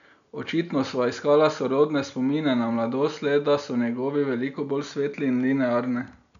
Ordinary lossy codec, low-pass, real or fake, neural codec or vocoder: none; 7.2 kHz; real; none